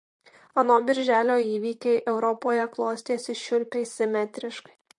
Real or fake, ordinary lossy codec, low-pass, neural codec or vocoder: fake; MP3, 48 kbps; 10.8 kHz; vocoder, 44.1 kHz, 128 mel bands, Pupu-Vocoder